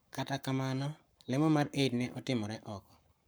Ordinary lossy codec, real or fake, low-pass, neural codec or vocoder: none; fake; none; codec, 44.1 kHz, 7.8 kbps, Pupu-Codec